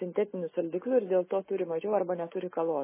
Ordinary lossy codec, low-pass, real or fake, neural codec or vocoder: MP3, 16 kbps; 3.6 kHz; real; none